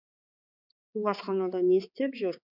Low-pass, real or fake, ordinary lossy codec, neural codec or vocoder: 5.4 kHz; fake; none; codec, 16 kHz, 4 kbps, X-Codec, HuBERT features, trained on balanced general audio